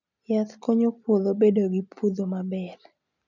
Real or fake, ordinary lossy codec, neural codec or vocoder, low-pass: real; none; none; 7.2 kHz